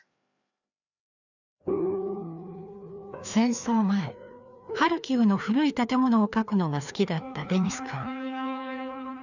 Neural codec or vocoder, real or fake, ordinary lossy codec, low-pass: codec, 16 kHz, 2 kbps, FreqCodec, larger model; fake; none; 7.2 kHz